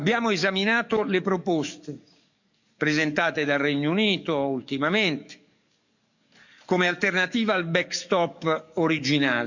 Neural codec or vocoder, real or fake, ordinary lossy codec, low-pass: codec, 44.1 kHz, 7.8 kbps, DAC; fake; none; 7.2 kHz